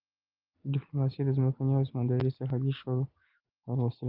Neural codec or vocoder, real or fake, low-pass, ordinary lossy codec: none; real; 5.4 kHz; Opus, 32 kbps